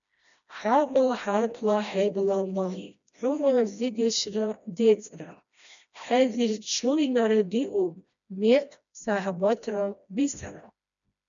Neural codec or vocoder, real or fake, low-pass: codec, 16 kHz, 1 kbps, FreqCodec, smaller model; fake; 7.2 kHz